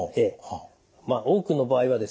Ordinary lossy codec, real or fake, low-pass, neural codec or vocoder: none; real; none; none